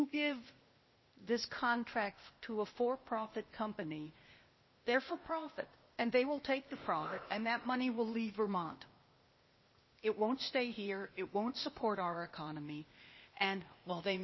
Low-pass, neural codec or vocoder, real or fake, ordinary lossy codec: 7.2 kHz; codec, 16 kHz, 0.8 kbps, ZipCodec; fake; MP3, 24 kbps